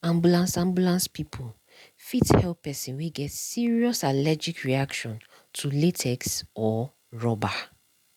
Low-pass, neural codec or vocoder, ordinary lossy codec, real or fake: 19.8 kHz; none; none; real